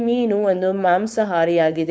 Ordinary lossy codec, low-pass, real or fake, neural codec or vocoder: none; none; fake; codec, 16 kHz, 4.8 kbps, FACodec